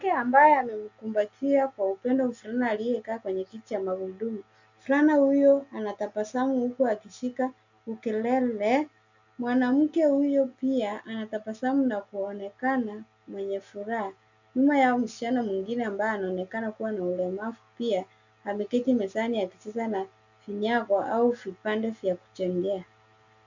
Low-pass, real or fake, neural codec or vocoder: 7.2 kHz; real; none